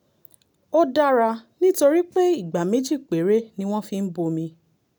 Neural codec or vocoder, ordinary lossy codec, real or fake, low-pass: none; none; real; none